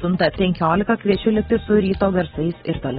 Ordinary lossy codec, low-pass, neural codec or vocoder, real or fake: AAC, 16 kbps; 19.8 kHz; none; real